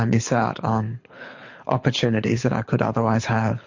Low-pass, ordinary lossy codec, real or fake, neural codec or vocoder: 7.2 kHz; MP3, 48 kbps; fake; codec, 24 kHz, 6 kbps, HILCodec